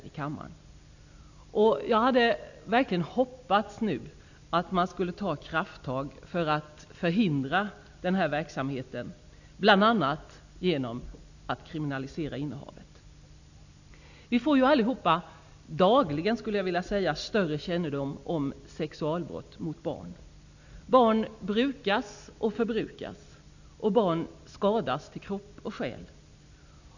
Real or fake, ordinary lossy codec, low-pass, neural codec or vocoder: real; none; 7.2 kHz; none